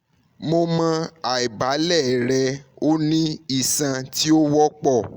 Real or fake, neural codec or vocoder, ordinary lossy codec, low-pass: real; none; Opus, 64 kbps; 19.8 kHz